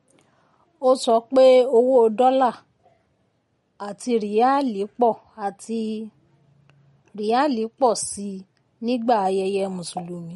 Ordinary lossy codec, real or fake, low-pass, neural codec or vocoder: MP3, 48 kbps; real; 19.8 kHz; none